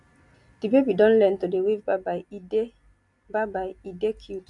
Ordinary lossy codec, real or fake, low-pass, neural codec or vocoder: none; real; 10.8 kHz; none